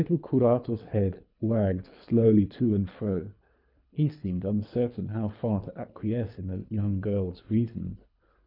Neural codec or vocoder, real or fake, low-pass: codec, 24 kHz, 3 kbps, HILCodec; fake; 5.4 kHz